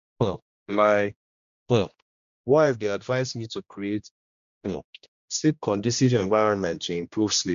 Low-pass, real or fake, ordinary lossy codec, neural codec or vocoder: 7.2 kHz; fake; MP3, 64 kbps; codec, 16 kHz, 1 kbps, X-Codec, HuBERT features, trained on general audio